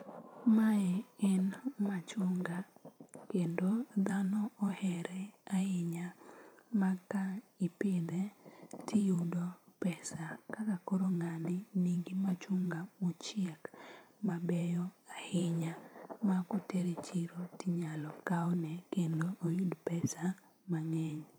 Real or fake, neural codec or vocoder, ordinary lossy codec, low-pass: fake; vocoder, 44.1 kHz, 128 mel bands every 512 samples, BigVGAN v2; none; none